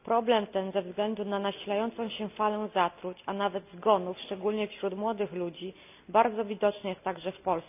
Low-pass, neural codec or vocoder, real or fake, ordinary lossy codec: 3.6 kHz; none; real; none